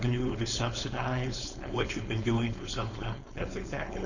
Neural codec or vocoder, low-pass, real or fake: codec, 16 kHz, 4.8 kbps, FACodec; 7.2 kHz; fake